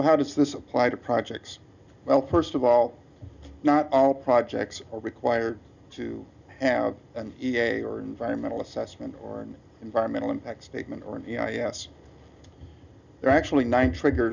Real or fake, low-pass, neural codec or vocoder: real; 7.2 kHz; none